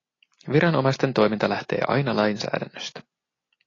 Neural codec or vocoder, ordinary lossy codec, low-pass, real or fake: none; AAC, 32 kbps; 7.2 kHz; real